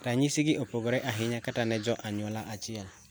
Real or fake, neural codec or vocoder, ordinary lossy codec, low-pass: real; none; none; none